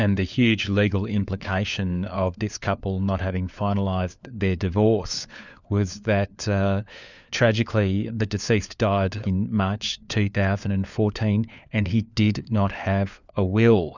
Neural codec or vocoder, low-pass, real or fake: codec, 16 kHz, 4 kbps, FunCodec, trained on LibriTTS, 50 frames a second; 7.2 kHz; fake